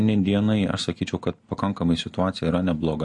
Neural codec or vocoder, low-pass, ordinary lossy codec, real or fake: none; 10.8 kHz; MP3, 48 kbps; real